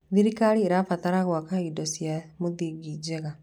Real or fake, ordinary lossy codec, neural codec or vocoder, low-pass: real; none; none; 19.8 kHz